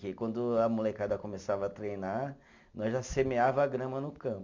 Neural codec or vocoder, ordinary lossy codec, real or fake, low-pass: none; MP3, 64 kbps; real; 7.2 kHz